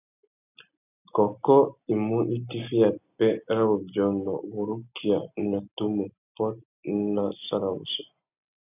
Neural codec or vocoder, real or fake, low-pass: none; real; 3.6 kHz